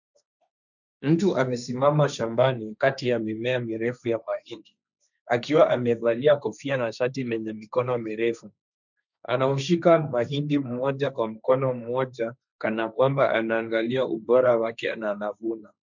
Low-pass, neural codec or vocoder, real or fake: 7.2 kHz; codec, 16 kHz, 1.1 kbps, Voila-Tokenizer; fake